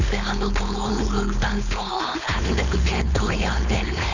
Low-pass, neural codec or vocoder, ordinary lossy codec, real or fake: 7.2 kHz; codec, 16 kHz, 4.8 kbps, FACodec; none; fake